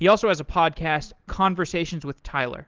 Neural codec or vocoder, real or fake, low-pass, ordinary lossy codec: none; real; 7.2 kHz; Opus, 16 kbps